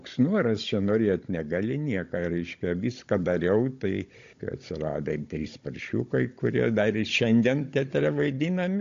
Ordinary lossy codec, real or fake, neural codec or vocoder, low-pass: AAC, 48 kbps; fake; codec, 16 kHz, 16 kbps, FunCodec, trained on Chinese and English, 50 frames a second; 7.2 kHz